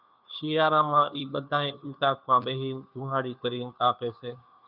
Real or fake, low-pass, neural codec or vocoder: fake; 5.4 kHz; codec, 16 kHz, 2 kbps, FunCodec, trained on Chinese and English, 25 frames a second